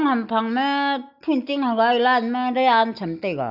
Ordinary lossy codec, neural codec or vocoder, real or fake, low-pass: none; none; real; 5.4 kHz